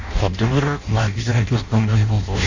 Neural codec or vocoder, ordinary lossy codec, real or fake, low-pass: codec, 16 kHz in and 24 kHz out, 0.6 kbps, FireRedTTS-2 codec; AAC, 48 kbps; fake; 7.2 kHz